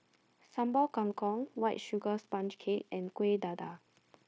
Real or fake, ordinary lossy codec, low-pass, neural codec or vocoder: fake; none; none; codec, 16 kHz, 0.9 kbps, LongCat-Audio-Codec